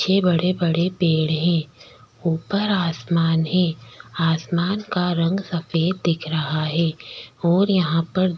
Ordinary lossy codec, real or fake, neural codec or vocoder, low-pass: none; real; none; none